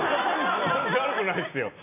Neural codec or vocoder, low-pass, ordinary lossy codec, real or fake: none; 3.6 kHz; MP3, 24 kbps; real